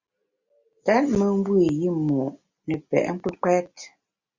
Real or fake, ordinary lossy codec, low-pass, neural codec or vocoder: real; Opus, 64 kbps; 7.2 kHz; none